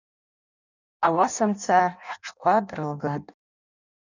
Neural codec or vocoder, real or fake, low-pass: codec, 16 kHz in and 24 kHz out, 0.6 kbps, FireRedTTS-2 codec; fake; 7.2 kHz